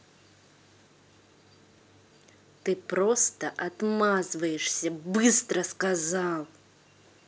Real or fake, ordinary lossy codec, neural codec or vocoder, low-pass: real; none; none; none